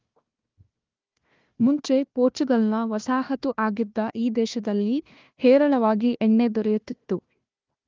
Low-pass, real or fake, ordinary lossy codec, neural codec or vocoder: 7.2 kHz; fake; Opus, 32 kbps; codec, 16 kHz, 1 kbps, FunCodec, trained on Chinese and English, 50 frames a second